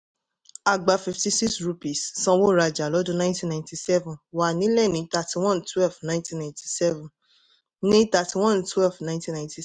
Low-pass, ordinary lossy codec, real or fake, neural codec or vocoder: none; none; real; none